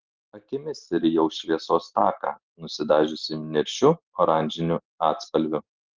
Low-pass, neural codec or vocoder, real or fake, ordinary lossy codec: 7.2 kHz; none; real; Opus, 16 kbps